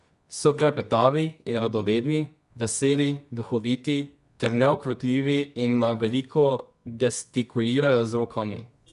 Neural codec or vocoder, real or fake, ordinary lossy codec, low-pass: codec, 24 kHz, 0.9 kbps, WavTokenizer, medium music audio release; fake; none; 10.8 kHz